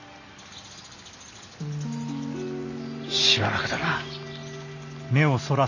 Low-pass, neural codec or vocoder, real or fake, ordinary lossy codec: 7.2 kHz; none; real; none